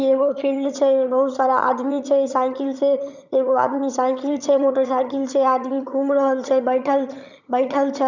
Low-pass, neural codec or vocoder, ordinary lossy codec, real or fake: 7.2 kHz; vocoder, 22.05 kHz, 80 mel bands, HiFi-GAN; none; fake